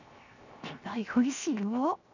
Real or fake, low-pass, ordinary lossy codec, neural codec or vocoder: fake; 7.2 kHz; none; codec, 16 kHz, 0.7 kbps, FocalCodec